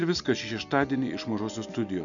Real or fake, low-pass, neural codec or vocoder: real; 7.2 kHz; none